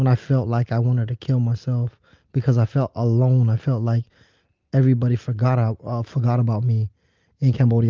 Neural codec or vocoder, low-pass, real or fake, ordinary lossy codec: none; 7.2 kHz; real; Opus, 24 kbps